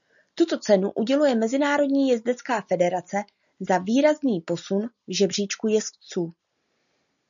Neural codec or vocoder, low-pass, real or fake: none; 7.2 kHz; real